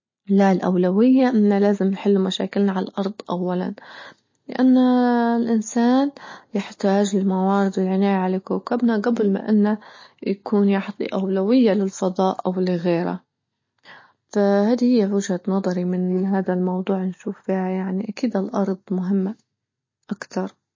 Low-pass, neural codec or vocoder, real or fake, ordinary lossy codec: 7.2 kHz; none; real; MP3, 32 kbps